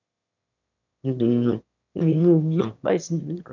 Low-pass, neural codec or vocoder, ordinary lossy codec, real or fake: 7.2 kHz; autoencoder, 22.05 kHz, a latent of 192 numbers a frame, VITS, trained on one speaker; none; fake